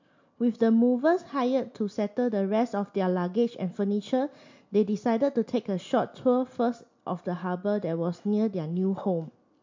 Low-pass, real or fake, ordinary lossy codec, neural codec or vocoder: 7.2 kHz; real; MP3, 48 kbps; none